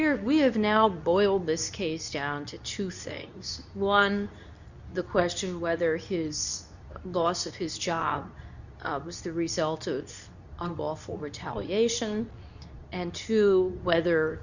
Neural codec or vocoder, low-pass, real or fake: codec, 24 kHz, 0.9 kbps, WavTokenizer, medium speech release version 2; 7.2 kHz; fake